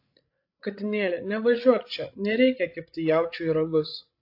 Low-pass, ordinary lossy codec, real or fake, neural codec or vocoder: 5.4 kHz; AAC, 32 kbps; fake; codec, 16 kHz, 16 kbps, FreqCodec, larger model